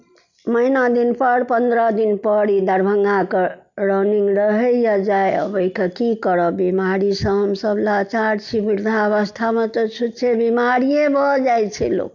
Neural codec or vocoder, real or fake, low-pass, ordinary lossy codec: none; real; 7.2 kHz; none